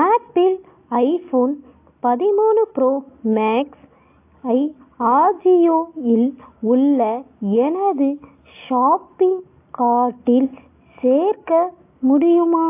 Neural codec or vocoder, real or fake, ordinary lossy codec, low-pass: none; real; AAC, 24 kbps; 3.6 kHz